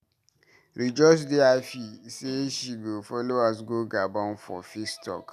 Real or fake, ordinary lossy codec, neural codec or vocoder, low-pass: real; none; none; 14.4 kHz